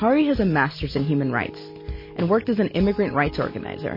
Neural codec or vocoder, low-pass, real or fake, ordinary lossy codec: none; 5.4 kHz; real; MP3, 24 kbps